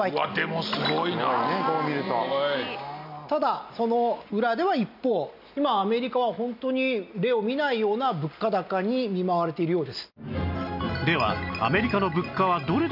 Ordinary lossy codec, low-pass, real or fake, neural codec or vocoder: none; 5.4 kHz; real; none